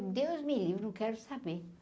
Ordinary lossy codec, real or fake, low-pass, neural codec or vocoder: none; real; none; none